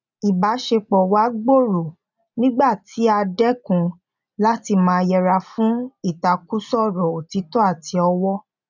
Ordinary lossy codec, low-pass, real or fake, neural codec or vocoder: none; 7.2 kHz; real; none